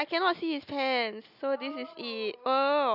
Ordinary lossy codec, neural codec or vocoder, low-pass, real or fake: none; none; 5.4 kHz; real